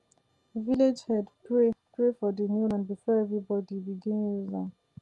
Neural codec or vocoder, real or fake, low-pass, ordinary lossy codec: none; real; 10.8 kHz; Opus, 32 kbps